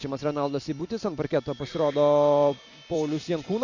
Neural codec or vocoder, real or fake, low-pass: vocoder, 44.1 kHz, 128 mel bands every 256 samples, BigVGAN v2; fake; 7.2 kHz